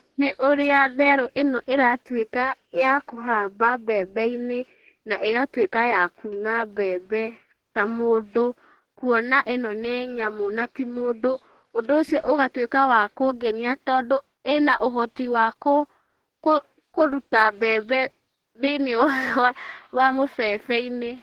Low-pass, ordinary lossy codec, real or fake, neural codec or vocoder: 19.8 kHz; Opus, 16 kbps; fake; codec, 44.1 kHz, 2.6 kbps, DAC